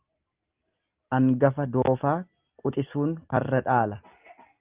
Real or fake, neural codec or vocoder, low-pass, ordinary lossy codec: real; none; 3.6 kHz; Opus, 32 kbps